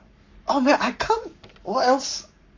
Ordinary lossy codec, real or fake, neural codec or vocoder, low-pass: MP3, 48 kbps; fake; codec, 44.1 kHz, 7.8 kbps, Pupu-Codec; 7.2 kHz